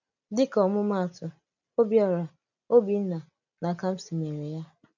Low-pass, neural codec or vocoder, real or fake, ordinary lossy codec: 7.2 kHz; none; real; none